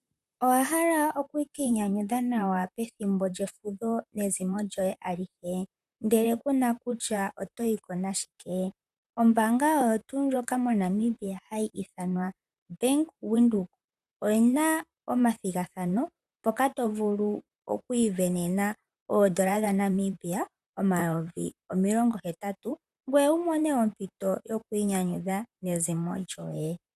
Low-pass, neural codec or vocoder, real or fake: 14.4 kHz; vocoder, 44.1 kHz, 128 mel bands, Pupu-Vocoder; fake